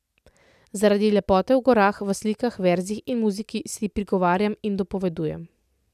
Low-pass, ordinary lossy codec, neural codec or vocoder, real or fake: 14.4 kHz; none; none; real